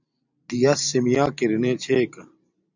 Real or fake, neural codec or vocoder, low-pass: fake; vocoder, 44.1 kHz, 128 mel bands every 512 samples, BigVGAN v2; 7.2 kHz